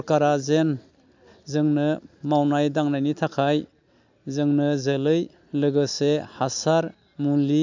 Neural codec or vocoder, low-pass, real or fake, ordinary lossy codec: none; 7.2 kHz; real; MP3, 64 kbps